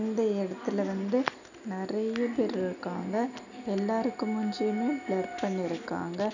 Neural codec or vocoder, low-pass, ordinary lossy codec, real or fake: none; 7.2 kHz; none; real